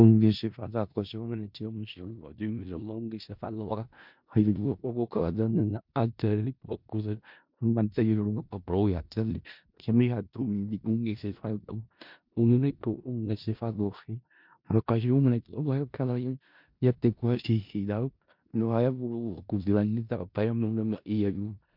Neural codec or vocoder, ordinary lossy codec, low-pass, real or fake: codec, 16 kHz in and 24 kHz out, 0.4 kbps, LongCat-Audio-Codec, four codebook decoder; MP3, 48 kbps; 5.4 kHz; fake